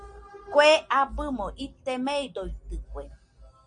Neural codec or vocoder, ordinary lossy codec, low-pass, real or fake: none; MP3, 64 kbps; 9.9 kHz; real